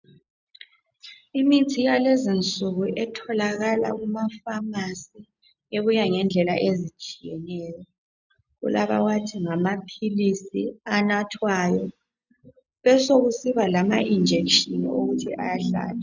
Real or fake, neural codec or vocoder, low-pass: real; none; 7.2 kHz